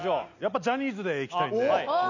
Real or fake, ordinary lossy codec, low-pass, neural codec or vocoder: real; none; 7.2 kHz; none